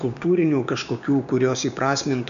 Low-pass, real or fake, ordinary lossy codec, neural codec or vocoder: 7.2 kHz; fake; AAC, 96 kbps; codec, 16 kHz, 6 kbps, DAC